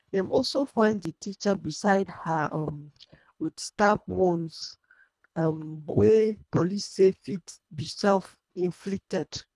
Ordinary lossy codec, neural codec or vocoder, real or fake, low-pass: none; codec, 24 kHz, 1.5 kbps, HILCodec; fake; none